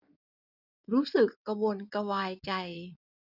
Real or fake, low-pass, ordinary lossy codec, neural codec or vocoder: fake; 5.4 kHz; none; codec, 16 kHz in and 24 kHz out, 2.2 kbps, FireRedTTS-2 codec